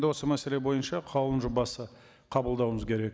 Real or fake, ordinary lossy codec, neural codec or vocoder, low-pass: real; none; none; none